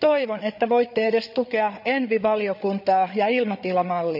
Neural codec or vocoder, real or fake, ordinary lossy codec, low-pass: codec, 16 kHz, 8 kbps, FreqCodec, larger model; fake; none; 5.4 kHz